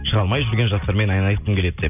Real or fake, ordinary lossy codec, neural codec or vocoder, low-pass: real; none; none; 3.6 kHz